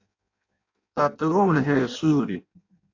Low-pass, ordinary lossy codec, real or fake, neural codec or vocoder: 7.2 kHz; AAC, 48 kbps; fake; codec, 16 kHz in and 24 kHz out, 0.6 kbps, FireRedTTS-2 codec